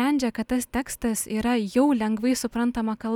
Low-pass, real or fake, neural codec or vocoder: 19.8 kHz; real; none